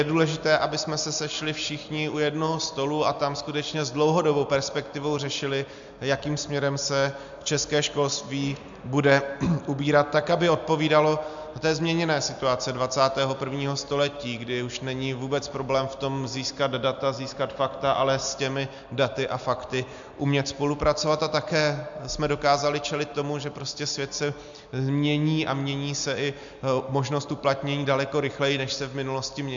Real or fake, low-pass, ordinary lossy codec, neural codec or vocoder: real; 7.2 kHz; MP3, 64 kbps; none